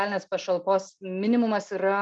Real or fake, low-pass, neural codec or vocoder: real; 9.9 kHz; none